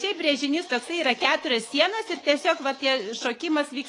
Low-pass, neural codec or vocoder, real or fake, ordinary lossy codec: 10.8 kHz; codec, 24 kHz, 3.1 kbps, DualCodec; fake; AAC, 32 kbps